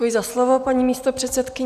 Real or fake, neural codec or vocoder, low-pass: real; none; 14.4 kHz